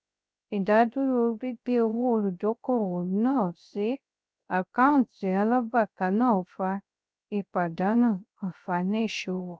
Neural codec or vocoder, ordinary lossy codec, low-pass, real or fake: codec, 16 kHz, 0.3 kbps, FocalCodec; none; none; fake